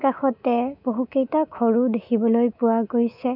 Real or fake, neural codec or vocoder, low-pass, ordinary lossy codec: fake; codec, 24 kHz, 3.1 kbps, DualCodec; 5.4 kHz; none